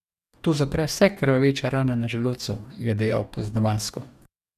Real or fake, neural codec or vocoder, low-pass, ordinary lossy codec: fake; codec, 44.1 kHz, 2.6 kbps, DAC; 14.4 kHz; none